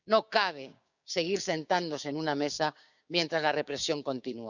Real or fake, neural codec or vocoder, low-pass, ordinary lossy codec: fake; codec, 16 kHz, 6 kbps, DAC; 7.2 kHz; none